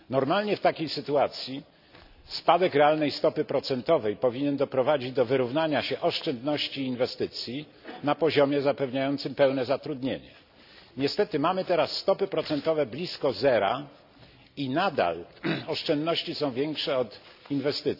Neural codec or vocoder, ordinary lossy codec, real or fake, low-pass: none; none; real; 5.4 kHz